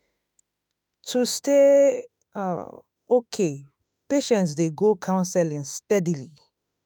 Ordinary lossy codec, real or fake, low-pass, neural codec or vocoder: none; fake; none; autoencoder, 48 kHz, 32 numbers a frame, DAC-VAE, trained on Japanese speech